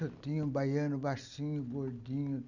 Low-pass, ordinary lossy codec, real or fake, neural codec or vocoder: 7.2 kHz; none; real; none